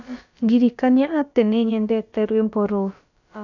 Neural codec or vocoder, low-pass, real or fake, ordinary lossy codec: codec, 16 kHz, about 1 kbps, DyCAST, with the encoder's durations; 7.2 kHz; fake; none